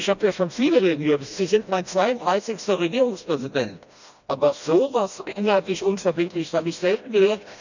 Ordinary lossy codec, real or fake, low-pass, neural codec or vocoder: none; fake; 7.2 kHz; codec, 16 kHz, 1 kbps, FreqCodec, smaller model